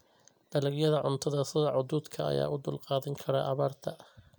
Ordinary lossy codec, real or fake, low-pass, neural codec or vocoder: none; real; none; none